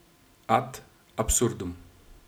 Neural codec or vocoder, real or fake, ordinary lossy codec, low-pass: none; real; none; none